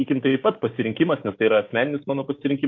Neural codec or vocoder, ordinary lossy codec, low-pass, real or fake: codec, 16 kHz, 6 kbps, DAC; MP3, 48 kbps; 7.2 kHz; fake